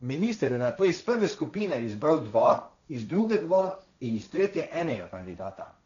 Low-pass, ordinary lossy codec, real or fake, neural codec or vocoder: 7.2 kHz; MP3, 96 kbps; fake; codec, 16 kHz, 1.1 kbps, Voila-Tokenizer